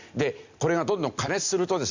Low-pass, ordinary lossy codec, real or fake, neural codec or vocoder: 7.2 kHz; Opus, 64 kbps; real; none